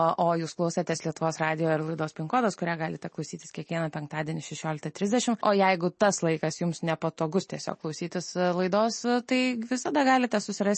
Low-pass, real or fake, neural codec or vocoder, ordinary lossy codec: 10.8 kHz; real; none; MP3, 32 kbps